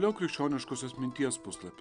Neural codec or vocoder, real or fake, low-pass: none; real; 9.9 kHz